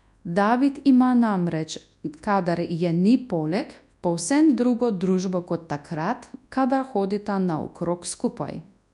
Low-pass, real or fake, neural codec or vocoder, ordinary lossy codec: 10.8 kHz; fake; codec, 24 kHz, 0.9 kbps, WavTokenizer, large speech release; none